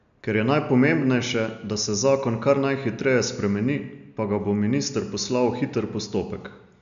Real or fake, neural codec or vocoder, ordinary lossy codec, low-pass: real; none; none; 7.2 kHz